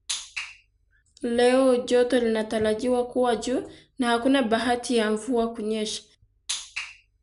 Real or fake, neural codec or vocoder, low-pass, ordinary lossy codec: real; none; 10.8 kHz; none